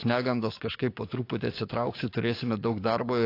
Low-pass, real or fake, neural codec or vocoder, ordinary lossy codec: 5.4 kHz; fake; codec, 44.1 kHz, 7.8 kbps, DAC; AAC, 32 kbps